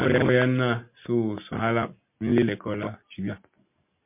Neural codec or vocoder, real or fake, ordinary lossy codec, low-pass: none; real; MP3, 32 kbps; 3.6 kHz